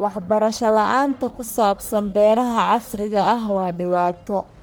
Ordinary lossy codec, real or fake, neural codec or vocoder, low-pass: none; fake; codec, 44.1 kHz, 1.7 kbps, Pupu-Codec; none